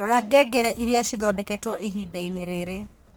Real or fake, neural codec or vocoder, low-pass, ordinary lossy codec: fake; codec, 44.1 kHz, 1.7 kbps, Pupu-Codec; none; none